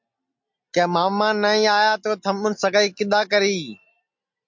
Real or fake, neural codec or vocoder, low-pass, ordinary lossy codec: real; none; 7.2 kHz; MP3, 48 kbps